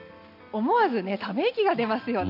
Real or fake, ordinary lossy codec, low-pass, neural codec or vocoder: real; none; 5.4 kHz; none